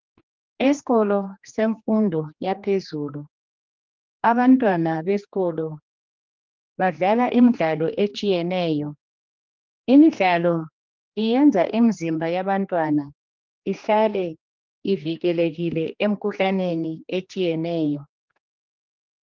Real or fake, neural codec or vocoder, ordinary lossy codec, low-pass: fake; codec, 16 kHz, 2 kbps, X-Codec, HuBERT features, trained on general audio; Opus, 32 kbps; 7.2 kHz